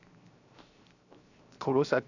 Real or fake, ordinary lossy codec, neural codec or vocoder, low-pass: fake; none; codec, 16 kHz, 0.7 kbps, FocalCodec; 7.2 kHz